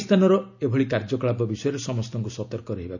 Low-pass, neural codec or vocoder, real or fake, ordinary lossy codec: 7.2 kHz; none; real; none